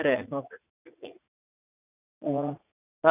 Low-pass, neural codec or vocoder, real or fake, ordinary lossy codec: 3.6 kHz; vocoder, 44.1 kHz, 80 mel bands, Vocos; fake; none